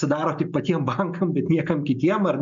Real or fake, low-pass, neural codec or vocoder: real; 7.2 kHz; none